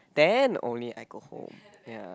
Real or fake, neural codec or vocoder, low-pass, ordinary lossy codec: real; none; none; none